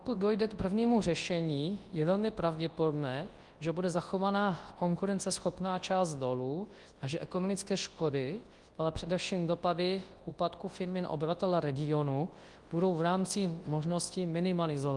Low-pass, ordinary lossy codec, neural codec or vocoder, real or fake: 10.8 kHz; Opus, 24 kbps; codec, 24 kHz, 0.9 kbps, WavTokenizer, large speech release; fake